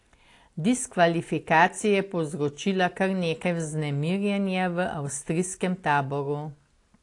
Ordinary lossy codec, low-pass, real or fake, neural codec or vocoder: AAC, 48 kbps; 10.8 kHz; real; none